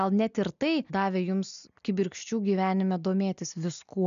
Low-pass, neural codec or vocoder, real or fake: 7.2 kHz; none; real